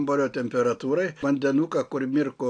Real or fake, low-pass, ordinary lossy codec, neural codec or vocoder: real; 9.9 kHz; AAC, 48 kbps; none